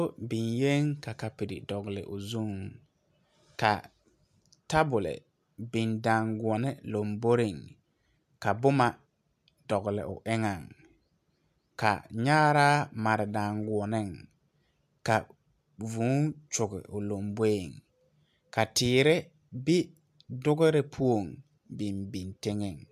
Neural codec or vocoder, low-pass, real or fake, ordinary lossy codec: none; 14.4 kHz; real; MP3, 96 kbps